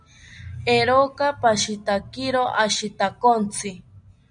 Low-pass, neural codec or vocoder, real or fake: 9.9 kHz; none; real